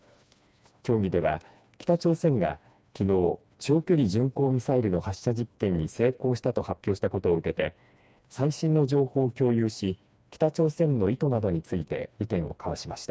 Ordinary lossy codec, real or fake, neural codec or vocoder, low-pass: none; fake; codec, 16 kHz, 2 kbps, FreqCodec, smaller model; none